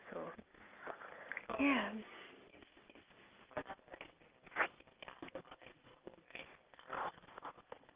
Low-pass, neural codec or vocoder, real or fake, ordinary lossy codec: 3.6 kHz; none; real; Opus, 24 kbps